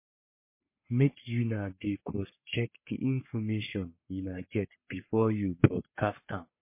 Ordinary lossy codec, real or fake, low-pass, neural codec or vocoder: MP3, 24 kbps; fake; 3.6 kHz; codec, 44.1 kHz, 3.4 kbps, Pupu-Codec